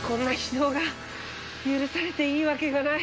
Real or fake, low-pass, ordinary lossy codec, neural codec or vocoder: real; none; none; none